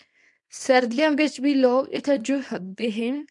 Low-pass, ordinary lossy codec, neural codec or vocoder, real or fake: 10.8 kHz; MP3, 64 kbps; codec, 24 kHz, 0.9 kbps, WavTokenizer, small release; fake